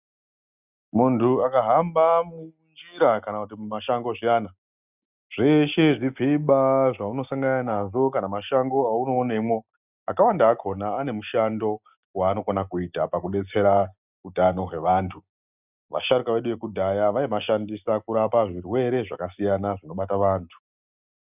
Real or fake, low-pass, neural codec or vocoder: real; 3.6 kHz; none